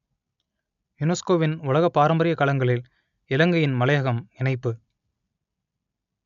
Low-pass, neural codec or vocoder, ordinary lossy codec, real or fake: 7.2 kHz; none; none; real